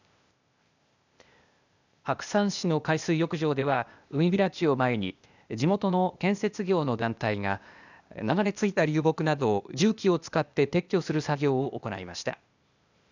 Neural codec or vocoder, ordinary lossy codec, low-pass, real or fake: codec, 16 kHz, 0.8 kbps, ZipCodec; none; 7.2 kHz; fake